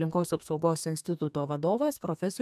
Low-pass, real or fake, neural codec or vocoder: 14.4 kHz; fake; codec, 32 kHz, 1.9 kbps, SNAC